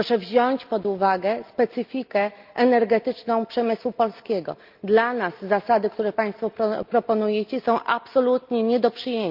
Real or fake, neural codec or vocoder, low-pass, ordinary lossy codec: real; none; 5.4 kHz; Opus, 24 kbps